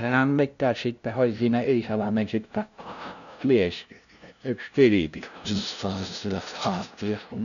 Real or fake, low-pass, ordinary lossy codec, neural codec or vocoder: fake; 7.2 kHz; none; codec, 16 kHz, 0.5 kbps, FunCodec, trained on LibriTTS, 25 frames a second